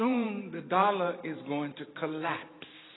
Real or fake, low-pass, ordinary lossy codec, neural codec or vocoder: fake; 7.2 kHz; AAC, 16 kbps; vocoder, 44.1 kHz, 128 mel bands every 512 samples, BigVGAN v2